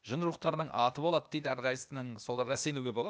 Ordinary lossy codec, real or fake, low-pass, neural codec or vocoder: none; fake; none; codec, 16 kHz, 0.8 kbps, ZipCodec